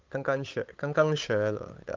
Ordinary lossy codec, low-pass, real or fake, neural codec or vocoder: Opus, 24 kbps; 7.2 kHz; fake; codec, 16 kHz, 8 kbps, FunCodec, trained on Chinese and English, 25 frames a second